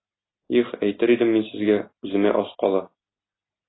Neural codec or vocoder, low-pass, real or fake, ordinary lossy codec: none; 7.2 kHz; real; AAC, 16 kbps